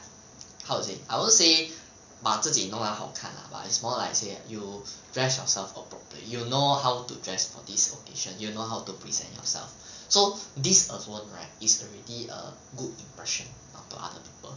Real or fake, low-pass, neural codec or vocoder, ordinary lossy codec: real; 7.2 kHz; none; none